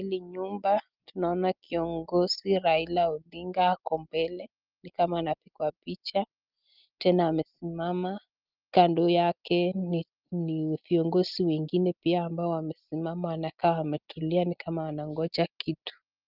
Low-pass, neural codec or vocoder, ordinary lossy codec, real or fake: 5.4 kHz; none; Opus, 24 kbps; real